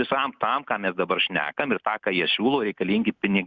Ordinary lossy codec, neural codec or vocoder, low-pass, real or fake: Opus, 64 kbps; none; 7.2 kHz; real